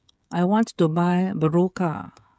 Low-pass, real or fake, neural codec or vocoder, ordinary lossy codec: none; fake; codec, 16 kHz, 16 kbps, FreqCodec, smaller model; none